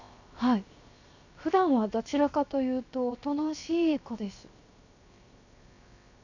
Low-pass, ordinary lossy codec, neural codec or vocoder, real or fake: 7.2 kHz; none; codec, 16 kHz, 0.7 kbps, FocalCodec; fake